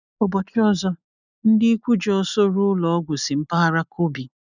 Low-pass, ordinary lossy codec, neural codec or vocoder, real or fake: 7.2 kHz; none; none; real